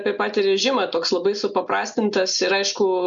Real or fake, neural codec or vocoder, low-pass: real; none; 7.2 kHz